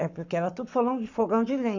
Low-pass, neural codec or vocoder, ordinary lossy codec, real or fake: 7.2 kHz; codec, 44.1 kHz, 7.8 kbps, Pupu-Codec; none; fake